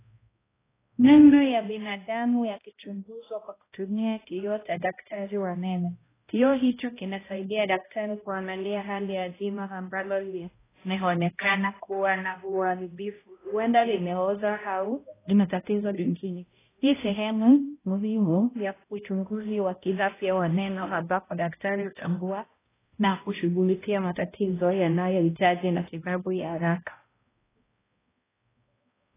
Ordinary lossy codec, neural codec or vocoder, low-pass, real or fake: AAC, 16 kbps; codec, 16 kHz, 0.5 kbps, X-Codec, HuBERT features, trained on balanced general audio; 3.6 kHz; fake